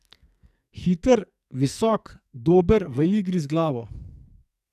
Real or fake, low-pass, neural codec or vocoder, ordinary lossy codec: fake; 14.4 kHz; codec, 44.1 kHz, 2.6 kbps, SNAC; none